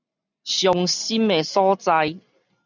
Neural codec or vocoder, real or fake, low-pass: none; real; 7.2 kHz